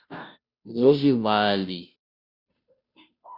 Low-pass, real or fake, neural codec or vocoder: 5.4 kHz; fake; codec, 16 kHz, 0.5 kbps, FunCodec, trained on Chinese and English, 25 frames a second